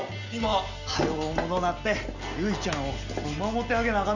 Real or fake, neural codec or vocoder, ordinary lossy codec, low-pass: real; none; none; 7.2 kHz